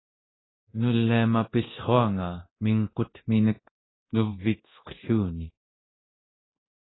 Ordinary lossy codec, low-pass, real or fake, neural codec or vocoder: AAC, 16 kbps; 7.2 kHz; fake; codec, 24 kHz, 0.9 kbps, DualCodec